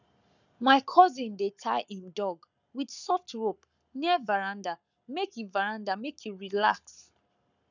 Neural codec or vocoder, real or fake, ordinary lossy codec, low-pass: codec, 44.1 kHz, 7.8 kbps, Pupu-Codec; fake; none; 7.2 kHz